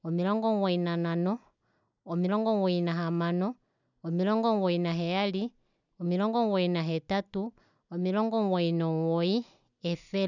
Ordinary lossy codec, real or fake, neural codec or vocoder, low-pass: none; real; none; 7.2 kHz